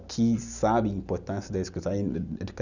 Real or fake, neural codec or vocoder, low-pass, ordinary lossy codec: real; none; 7.2 kHz; none